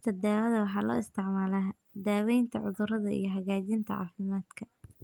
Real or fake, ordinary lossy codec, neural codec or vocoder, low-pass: real; Opus, 32 kbps; none; 19.8 kHz